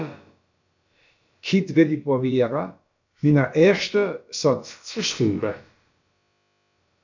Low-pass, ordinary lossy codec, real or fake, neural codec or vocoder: 7.2 kHz; MP3, 64 kbps; fake; codec, 16 kHz, about 1 kbps, DyCAST, with the encoder's durations